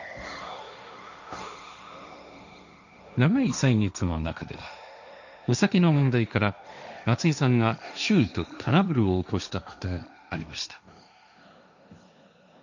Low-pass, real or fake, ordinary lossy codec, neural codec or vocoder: 7.2 kHz; fake; none; codec, 16 kHz, 1.1 kbps, Voila-Tokenizer